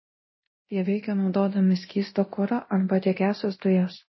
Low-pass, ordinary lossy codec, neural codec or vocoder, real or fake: 7.2 kHz; MP3, 24 kbps; codec, 24 kHz, 0.9 kbps, DualCodec; fake